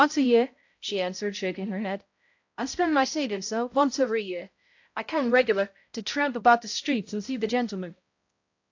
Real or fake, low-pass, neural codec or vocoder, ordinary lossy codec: fake; 7.2 kHz; codec, 16 kHz, 0.5 kbps, X-Codec, HuBERT features, trained on balanced general audio; AAC, 48 kbps